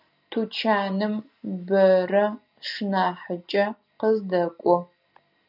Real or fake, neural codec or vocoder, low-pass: real; none; 5.4 kHz